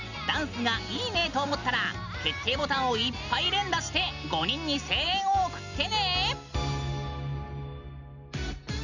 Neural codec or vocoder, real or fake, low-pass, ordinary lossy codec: none; real; 7.2 kHz; none